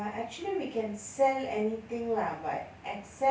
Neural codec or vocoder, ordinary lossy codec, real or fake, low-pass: none; none; real; none